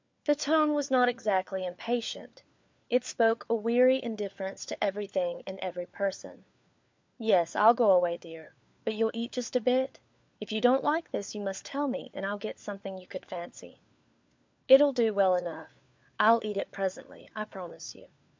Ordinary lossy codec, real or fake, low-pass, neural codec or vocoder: MP3, 64 kbps; fake; 7.2 kHz; codec, 16 kHz, 4 kbps, FunCodec, trained on LibriTTS, 50 frames a second